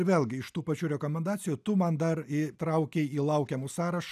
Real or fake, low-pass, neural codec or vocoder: real; 14.4 kHz; none